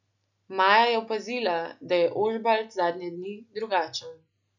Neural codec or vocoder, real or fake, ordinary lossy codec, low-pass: none; real; none; 7.2 kHz